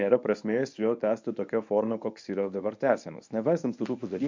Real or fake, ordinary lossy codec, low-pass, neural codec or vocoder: fake; MP3, 64 kbps; 7.2 kHz; codec, 24 kHz, 0.9 kbps, WavTokenizer, medium speech release version 1